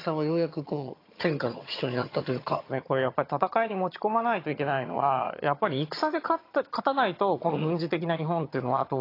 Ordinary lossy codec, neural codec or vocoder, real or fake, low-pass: AAC, 32 kbps; vocoder, 22.05 kHz, 80 mel bands, HiFi-GAN; fake; 5.4 kHz